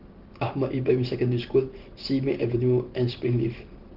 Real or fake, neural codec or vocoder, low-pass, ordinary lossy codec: real; none; 5.4 kHz; Opus, 16 kbps